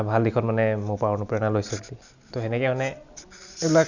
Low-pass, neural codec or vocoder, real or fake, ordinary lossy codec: 7.2 kHz; none; real; none